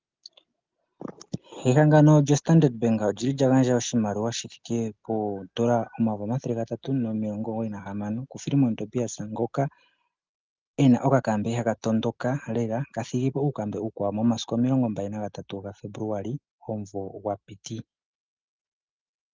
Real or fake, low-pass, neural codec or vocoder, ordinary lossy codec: real; 7.2 kHz; none; Opus, 32 kbps